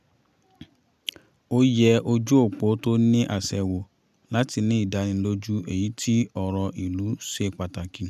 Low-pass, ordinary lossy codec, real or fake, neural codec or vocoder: 14.4 kHz; none; real; none